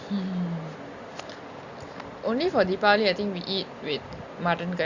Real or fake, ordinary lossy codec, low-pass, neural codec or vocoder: real; Opus, 64 kbps; 7.2 kHz; none